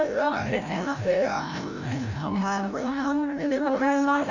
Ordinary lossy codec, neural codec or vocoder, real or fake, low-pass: none; codec, 16 kHz, 0.5 kbps, FreqCodec, larger model; fake; 7.2 kHz